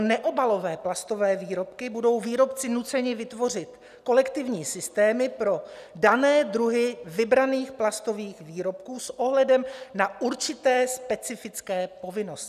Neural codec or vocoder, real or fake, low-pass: none; real; 14.4 kHz